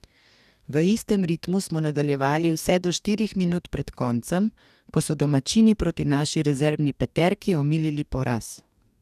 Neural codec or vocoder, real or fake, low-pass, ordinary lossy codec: codec, 44.1 kHz, 2.6 kbps, DAC; fake; 14.4 kHz; none